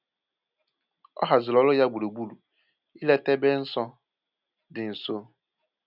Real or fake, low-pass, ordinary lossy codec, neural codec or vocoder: real; 5.4 kHz; none; none